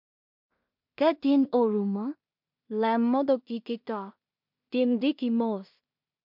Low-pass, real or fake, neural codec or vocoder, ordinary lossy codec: 5.4 kHz; fake; codec, 16 kHz in and 24 kHz out, 0.4 kbps, LongCat-Audio-Codec, two codebook decoder; MP3, 48 kbps